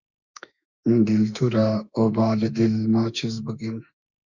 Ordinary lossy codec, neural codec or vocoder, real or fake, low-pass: Opus, 64 kbps; autoencoder, 48 kHz, 32 numbers a frame, DAC-VAE, trained on Japanese speech; fake; 7.2 kHz